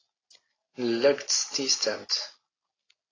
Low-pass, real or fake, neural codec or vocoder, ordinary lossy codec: 7.2 kHz; real; none; AAC, 32 kbps